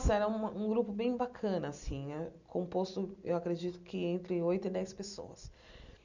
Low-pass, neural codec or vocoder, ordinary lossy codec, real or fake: 7.2 kHz; none; none; real